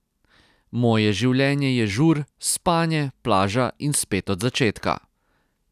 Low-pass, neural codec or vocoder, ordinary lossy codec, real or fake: 14.4 kHz; none; none; real